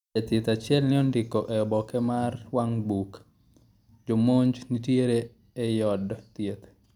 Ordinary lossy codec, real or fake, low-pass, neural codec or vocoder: none; real; 19.8 kHz; none